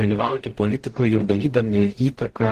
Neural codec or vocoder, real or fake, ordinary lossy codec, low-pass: codec, 44.1 kHz, 0.9 kbps, DAC; fake; Opus, 16 kbps; 14.4 kHz